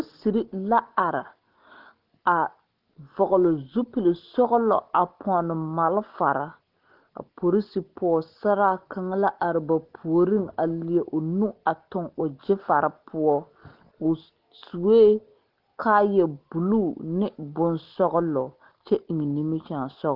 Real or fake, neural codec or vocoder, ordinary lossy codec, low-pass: real; none; Opus, 16 kbps; 5.4 kHz